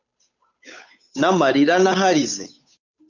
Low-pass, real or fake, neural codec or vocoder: 7.2 kHz; fake; codec, 16 kHz, 8 kbps, FunCodec, trained on Chinese and English, 25 frames a second